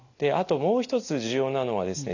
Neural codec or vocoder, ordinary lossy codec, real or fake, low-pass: none; none; real; 7.2 kHz